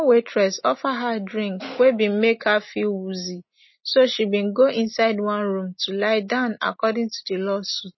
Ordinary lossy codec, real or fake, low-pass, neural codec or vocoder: MP3, 24 kbps; real; 7.2 kHz; none